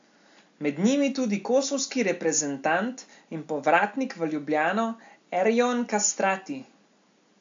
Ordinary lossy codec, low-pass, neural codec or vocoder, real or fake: AAC, 64 kbps; 7.2 kHz; none; real